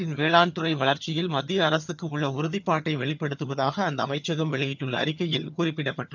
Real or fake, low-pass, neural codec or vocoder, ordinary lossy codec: fake; 7.2 kHz; vocoder, 22.05 kHz, 80 mel bands, HiFi-GAN; none